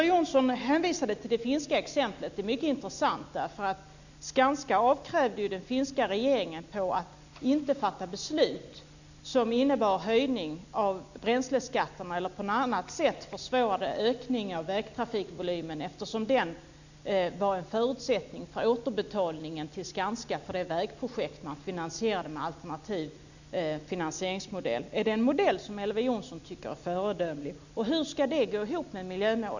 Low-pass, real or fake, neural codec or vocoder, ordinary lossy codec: 7.2 kHz; real; none; none